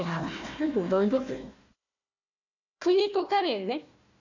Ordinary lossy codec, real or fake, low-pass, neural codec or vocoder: none; fake; 7.2 kHz; codec, 16 kHz, 1 kbps, FunCodec, trained on Chinese and English, 50 frames a second